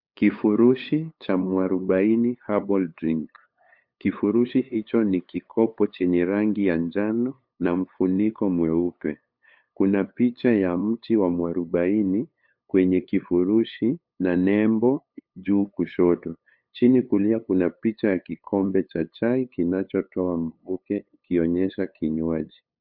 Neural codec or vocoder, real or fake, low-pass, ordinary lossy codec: codec, 16 kHz, 8 kbps, FunCodec, trained on LibriTTS, 25 frames a second; fake; 5.4 kHz; MP3, 48 kbps